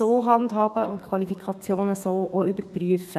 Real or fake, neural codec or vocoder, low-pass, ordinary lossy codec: fake; codec, 32 kHz, 1.9 kbps, SNAC; 14.4 kHz; none